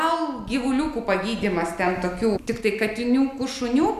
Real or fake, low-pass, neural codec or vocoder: real; 14.4 kHz; none